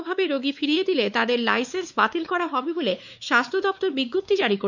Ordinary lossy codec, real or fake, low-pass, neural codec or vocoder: none; fake; 7.2 kHz; codec, 16 kHz, 4 kbps, X-Codec, WavLM features, trained on Multilingual LibriSpeech